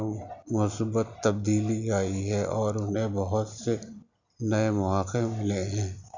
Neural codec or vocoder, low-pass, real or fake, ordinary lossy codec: none; 7.2 kHz; real; none